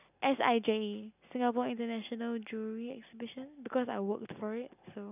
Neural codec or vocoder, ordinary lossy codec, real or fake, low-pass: none; none; real; 3.6 kHz